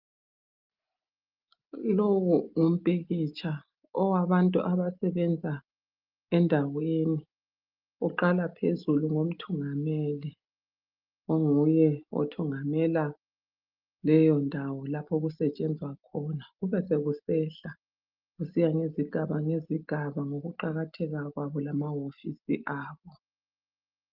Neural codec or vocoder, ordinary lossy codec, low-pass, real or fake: none; Opus, 24 kbps; 5.4 kHz; real